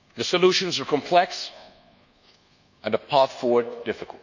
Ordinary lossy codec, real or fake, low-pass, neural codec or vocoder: none; fake; 7.2 kHz; codec, 24 kHz, 1.2 kbps, DualCodec